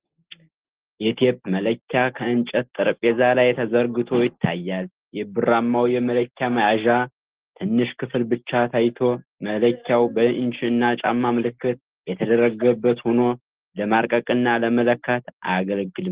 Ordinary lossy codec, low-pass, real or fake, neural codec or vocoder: Opus, 16 kbps; 3.6 kHz; real; none